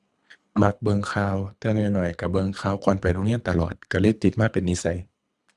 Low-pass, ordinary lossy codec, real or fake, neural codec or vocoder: none; none; fake; codec, 24 kHz, 3 kbps, HILCodec